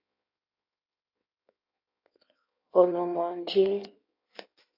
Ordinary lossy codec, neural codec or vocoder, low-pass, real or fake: AAC, 32 kbps; codec, 16 kHz in and 24 kHz out, 1.1 kbps, FireRedTTS-2 codec; 5.4 kHz; fake